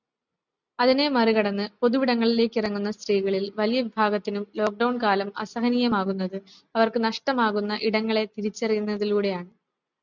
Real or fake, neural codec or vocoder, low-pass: real; none; 7.2 kHz